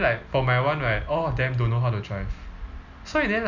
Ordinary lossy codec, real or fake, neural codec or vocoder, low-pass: none; real; none; 7.2 kHz